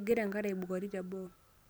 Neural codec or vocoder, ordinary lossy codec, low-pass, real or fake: none; none; none; real